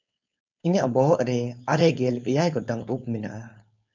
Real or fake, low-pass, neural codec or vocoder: fake; 7.2 kHz; codec, 16 kHz, 4.8 kbps, FACodec